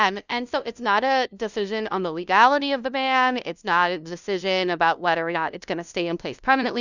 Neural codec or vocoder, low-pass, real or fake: codec, 16 kHz, 0.5 kbps, FunCodec, trained on LibriTTS, 25 frames a second; 7.2 kHz; fake